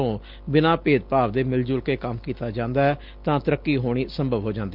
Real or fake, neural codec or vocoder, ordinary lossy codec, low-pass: real; none; Opus, 32 kbps; 5.4 kHz